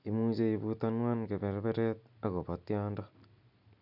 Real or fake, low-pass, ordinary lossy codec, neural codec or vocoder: real; 5.4 kHz; none; none